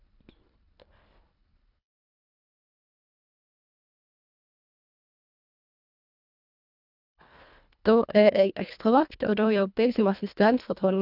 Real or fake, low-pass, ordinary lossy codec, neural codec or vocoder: fake; 5.4 kHz; none; codec, 24 kHz, 1.5 kbps, HILCodec